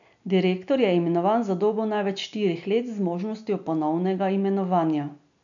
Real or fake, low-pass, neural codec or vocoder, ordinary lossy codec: real; 7.2 kHz; none; none